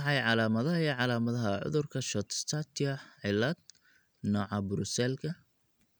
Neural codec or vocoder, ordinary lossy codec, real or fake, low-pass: none; none; real; none